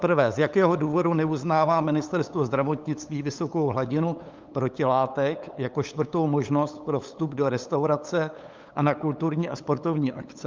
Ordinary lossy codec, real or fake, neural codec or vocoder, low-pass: Opus, 24 kbps; fake; codec, 16 kHz, 8 kbps, FunCodec, trained on LibriTTS, 25 frames a second; 7.2 kHz